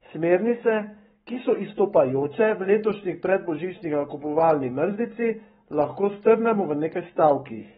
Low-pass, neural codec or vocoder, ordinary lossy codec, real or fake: 19.8 kHz; codec, 44.1 kHz, 7.8 kbps, Pupu-Codec; AAC, 16 kbps; fake